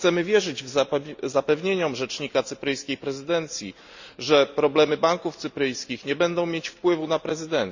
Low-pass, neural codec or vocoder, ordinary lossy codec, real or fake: 7.2 kHz; none; Opus, 64 kbps; real